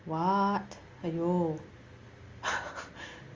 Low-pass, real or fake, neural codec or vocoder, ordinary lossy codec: 7.2 kHz; real; none; Opus, 32 kbps